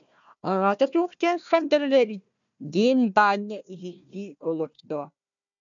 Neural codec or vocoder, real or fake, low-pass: codec, 16 kHz, 1 kbps, FunCodec, trained on Chinese and English, 50 frames a second; fake; 7.2 kHz